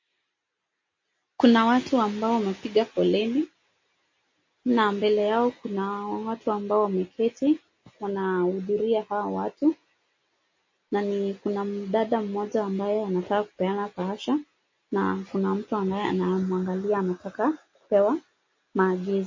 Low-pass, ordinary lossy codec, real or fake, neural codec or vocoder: 7.2 kHz; MP3, 32 kbps; real; none